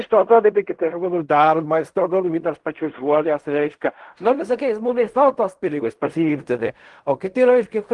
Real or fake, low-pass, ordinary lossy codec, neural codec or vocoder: fake; 10.8 kHz; Opus, 24 kbps; codec, 16 kHz in and 24 kHz out, 0.4 kbps, LongCat-Audio-Codec, fine tuned four codebook decoder